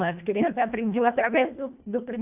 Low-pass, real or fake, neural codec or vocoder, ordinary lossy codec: 3.6 kHz; fake; codec, 24 kHz, 1.5 kbps, HILCodec; none